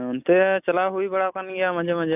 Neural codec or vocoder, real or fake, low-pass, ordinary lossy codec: none; real; 3.6 kHz; none